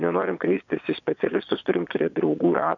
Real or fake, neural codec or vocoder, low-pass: fake; vocoder, 22.05 kHz, 80 mel bands, Vocos; 7.2 kHz